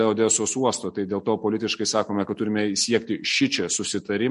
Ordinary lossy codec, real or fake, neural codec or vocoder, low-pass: MP3, 48 kbps; real; none; 14.4 kHz